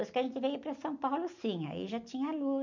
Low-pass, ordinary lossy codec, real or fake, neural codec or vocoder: 7.2 kHz; none; real; none